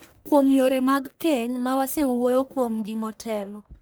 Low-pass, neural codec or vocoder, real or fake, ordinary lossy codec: none; codec, 44.1 kHz, 1.7 kbps, Pupu-Codec; fake; none